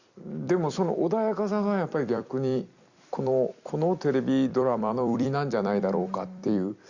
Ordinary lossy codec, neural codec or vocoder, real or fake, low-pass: Opus, 64 kbps; vocoder, 44.1 kHz, 128 mel bands every 256 samples, BigVGAN v2; fake; 7.2 kHz